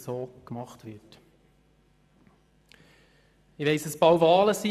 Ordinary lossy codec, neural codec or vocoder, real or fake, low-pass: none; vocoder, 44.1 kHz, 128 mel bands every 512 samples, BigVGAN v2; fake; 14.4 kHz